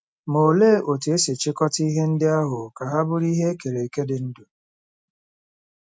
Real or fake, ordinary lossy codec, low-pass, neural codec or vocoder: real; none; none; none